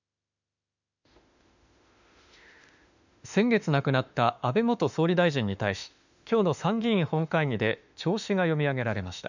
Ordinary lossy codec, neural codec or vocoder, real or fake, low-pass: none; autoencoder, 48 kHz, 32 numbers a frame, DAC-VAE, trained on Japanese speech; fake; 7.2 kHz